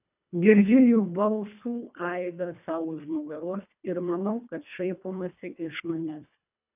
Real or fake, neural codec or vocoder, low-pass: fake; codec, 24 kHz, 1.5 kbps, HILCodec; 3.6 kHz